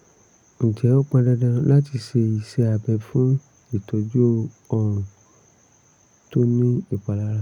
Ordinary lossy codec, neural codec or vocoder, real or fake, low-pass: none; none; real; 19.8 kHz